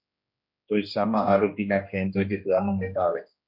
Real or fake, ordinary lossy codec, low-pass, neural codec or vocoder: fake; MP3, 48 kbps; 5.4 kHz; codec, 16 kHz, 1 kbps, X-Codec, HuBERT features, trained on general audio